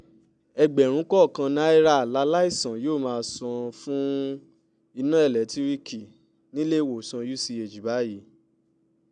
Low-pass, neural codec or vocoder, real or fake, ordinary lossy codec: 9.9 kHz; none; real; none